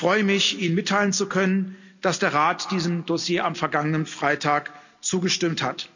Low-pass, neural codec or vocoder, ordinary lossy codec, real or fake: 7.2 kHz; none; none; real